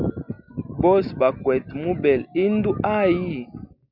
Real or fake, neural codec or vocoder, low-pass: real; none; 5.4 kHz